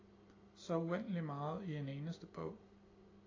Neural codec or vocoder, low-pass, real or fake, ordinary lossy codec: none; 7.2 kHz; real; AAC, 32 kbps